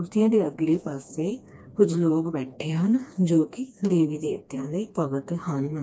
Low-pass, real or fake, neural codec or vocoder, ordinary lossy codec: none; fake; codec, 16 kHz, 2 kbps, FreqCodec, smaller model; none